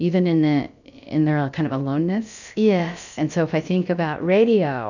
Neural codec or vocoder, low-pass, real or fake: codec, 16 kHz, about 1 kbps, DyCAST, with the encoder's durations; 7.2 kHz; fake